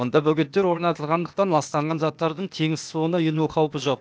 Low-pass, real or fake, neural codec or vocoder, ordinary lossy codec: none; fake; codec, 16 kHz, 0.8 kbps, ZipCodec; none